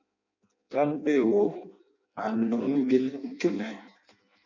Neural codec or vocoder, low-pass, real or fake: codec, 16 kHz in and 24 kHz out, 0.6 kbps, FireRedTTS-2 codec; 7.2 kHz; fake